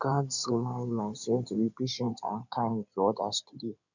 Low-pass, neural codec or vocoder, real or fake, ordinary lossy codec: 7.2 kHz; codec, 16 kHz in and 24 kHz out, 2.2 kbps, FireRedTTS-2 codec; fake; none